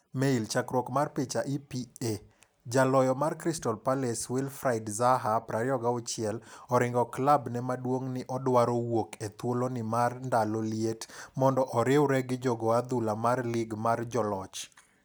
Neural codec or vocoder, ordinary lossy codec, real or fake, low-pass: none; none; real; none